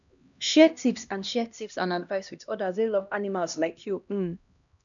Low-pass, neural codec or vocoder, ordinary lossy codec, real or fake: 7.2 kHz; codec, 16 kHz, 1 kbps, X-Codec, HuBERT features, trained on LibriSpeech; MP3, 96 kbps; fake